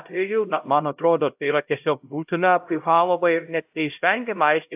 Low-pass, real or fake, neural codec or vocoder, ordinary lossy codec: 3.6 kHz; fake; codec, 16 kHz, 0.5 kbps, X-Codec, HuBERT features, trained on LibriSpeech; AAC, 32 kbps